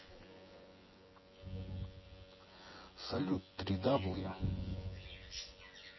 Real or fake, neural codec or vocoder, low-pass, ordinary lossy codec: fake; vocoder, 24 kHz, 100 mel bands, Vocos; 7.2 kHz; MP3, 24 kbps